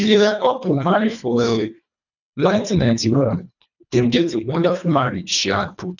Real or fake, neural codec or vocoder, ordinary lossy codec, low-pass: fake; codec, 24 kHz, 1.5 kbps, HILCodec; none; 7.2 kHz